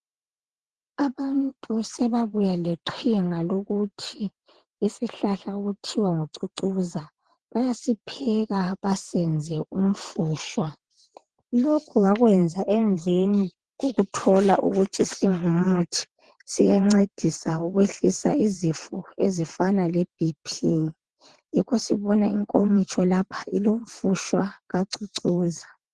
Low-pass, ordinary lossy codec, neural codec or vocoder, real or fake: 9.9 kHz; Opus, 16 kbps; vocoder, 22.05 kHz, 80 mel bands, WaveNeXt; fake